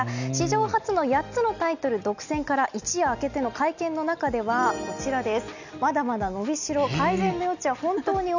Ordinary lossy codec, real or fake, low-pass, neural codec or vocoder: none; real; 7.2 kHz; none